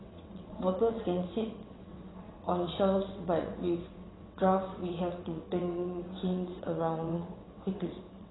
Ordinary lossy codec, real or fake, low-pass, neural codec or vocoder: AAC, 16 kbps; fake; 7.2 kHz; vocoder, 22.05 kHz, 80 mel bands, WaveNeXt